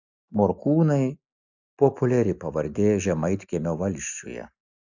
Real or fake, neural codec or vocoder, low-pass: real; none; 7.2 kHz